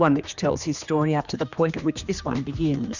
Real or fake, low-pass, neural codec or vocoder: fake; 7.2 kHz; codec, 16 kHz, 2 kbps, X-Codec, HuBERT features, trained on general audio